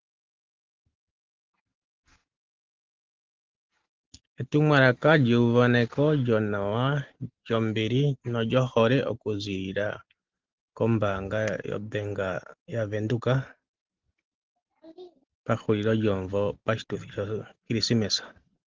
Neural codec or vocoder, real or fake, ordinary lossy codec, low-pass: none; real; Opus, 16 kbps; 7.2 kHz